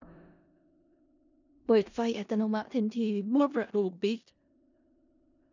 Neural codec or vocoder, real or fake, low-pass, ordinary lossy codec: codec, 16 kHz in and 24 kHz out, 0.4 kbps, LongCat-Audio-Codec, four codebook decoder; fake; 7.2 kHz; none